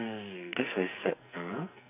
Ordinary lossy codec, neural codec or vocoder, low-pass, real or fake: none; codec, 44.1 kHz, 2.6 kbps, SNAC; 3.6 kHz; fake